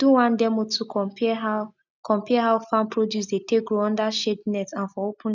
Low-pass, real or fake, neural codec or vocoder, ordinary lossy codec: 7.2 kHz; real; none; none